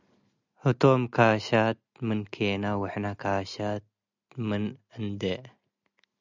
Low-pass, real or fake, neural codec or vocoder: 7.2 kHz; real; none